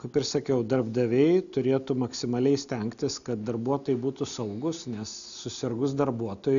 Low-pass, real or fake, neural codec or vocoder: 7.2 kHz; real; none